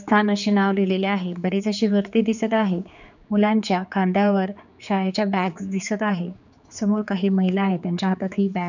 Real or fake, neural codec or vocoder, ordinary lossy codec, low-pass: fake; codec, 16 kHz, 4 kbps, X-Codec, HuBERT features, trained on general audio; none; 7.2 kHz